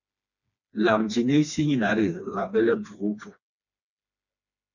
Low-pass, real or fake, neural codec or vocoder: 7.2 kHz; fake; codec, 16 kHz, 2 kbps, FreqCodec, smaller model